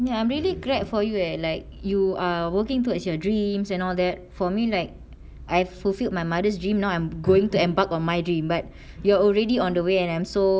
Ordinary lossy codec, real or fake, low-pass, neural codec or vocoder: none; real; none; none